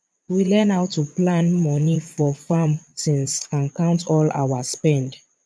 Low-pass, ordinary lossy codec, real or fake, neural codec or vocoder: none; none; fake; vocoder, 22.05 kHz, 80 mel bands, Vocos